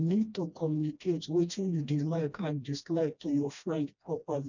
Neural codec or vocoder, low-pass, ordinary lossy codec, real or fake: codec, 16 kHz, 1 kbps, FreqCodec, smaller model; 7.2 kHz; none; fake